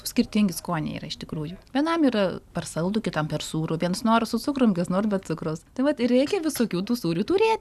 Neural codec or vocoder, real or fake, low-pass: none; real; 14.4 kHz